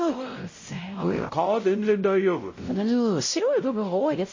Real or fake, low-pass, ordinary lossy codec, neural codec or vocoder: fake; 7.2 kHz; MP3, 32 kbps; codec, 16 kHz, 0.5 kbps, X-Codec, WavLM features, trained on Multilingual LibriSpeech